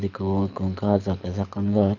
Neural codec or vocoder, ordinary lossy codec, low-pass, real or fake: codec, 24 kHz, 6 kbps, HILCodec; none; 7.2 kHz; fake